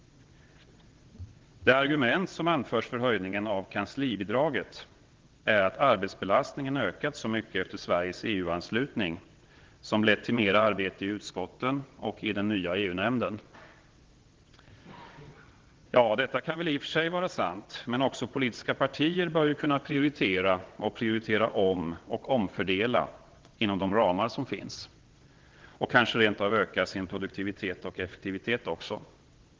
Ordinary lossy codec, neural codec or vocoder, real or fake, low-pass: Opus, 16 kbps; vocoder, 22.05 kHz, 80 mel bands, WaveNeXt; fake; 7.2 kHz